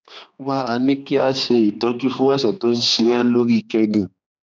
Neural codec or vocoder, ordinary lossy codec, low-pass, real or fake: codec, 16 kHz, 2 kbps, X-Codec, HuBERT features, trained on general audio; none; none; fake